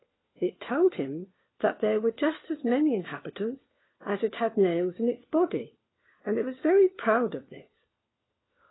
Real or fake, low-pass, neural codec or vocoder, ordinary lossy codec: fake; 7.2 kHz; codec, 16 kHz, 4 kbps, FunCodec, trained on LibriTTS, 50 frames a second; AAC, 16 kbps